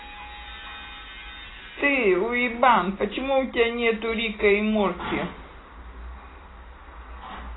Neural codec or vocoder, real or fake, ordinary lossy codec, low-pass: none; real; AAC, 16 kbps; 7.2 kHz